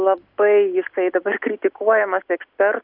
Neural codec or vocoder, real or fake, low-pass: none; real; 5.4 kHz